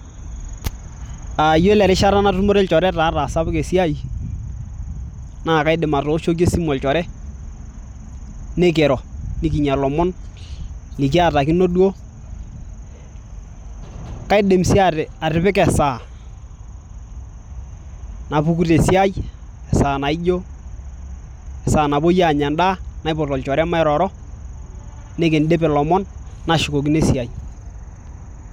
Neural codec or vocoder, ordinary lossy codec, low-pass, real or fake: none; none; 19.8 kHz; real